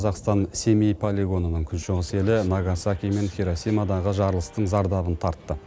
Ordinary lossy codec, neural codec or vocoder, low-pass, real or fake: none; none; none; real